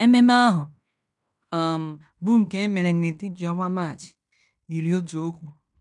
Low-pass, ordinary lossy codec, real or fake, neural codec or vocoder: 10.8 kHz; none; fake; codec, 16 kHz in and 24 kHz out, 0.9 kbps, LongCat-Audio-Codec, fine tuned four codebook decoder